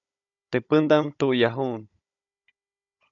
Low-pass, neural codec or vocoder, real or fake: 7.2 kHz; codec, 16 kHz, 16 kbps, FunCodec, trained on Chinese and English, 50 frames a second; fake